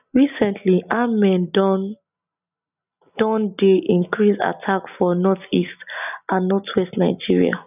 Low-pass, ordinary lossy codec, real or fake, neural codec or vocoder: 3.6 kHz; none; real; none